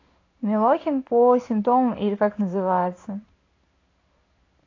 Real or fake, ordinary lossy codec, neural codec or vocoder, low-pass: fake; AAC, 32 kbps; codec, 16 kHz in and 24 kHz out, 1 kbps, XY-Tokenizer; 7.2 kHz